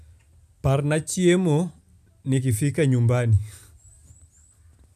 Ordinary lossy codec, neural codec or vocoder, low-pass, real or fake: none; none; 14.4 kHz; real